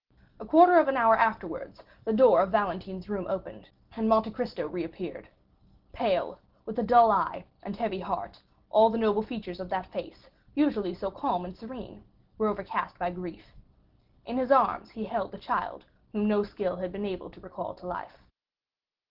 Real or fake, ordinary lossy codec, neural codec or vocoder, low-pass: real; Opus, 16 kbps; none; 5.4 kHz